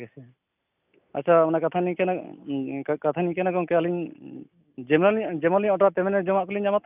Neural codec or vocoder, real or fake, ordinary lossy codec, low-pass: none; real; none; 3.6 kHz